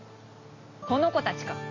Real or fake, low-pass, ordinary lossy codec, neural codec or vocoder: real; 7.2 kHz; AAC, 32 kbps; none